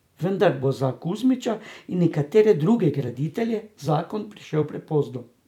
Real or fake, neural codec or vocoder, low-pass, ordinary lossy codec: fake; vocoder, 44.1 kHz, 128 mel bands every 256 samples, BigVGAN v2; 19.8 kHz; none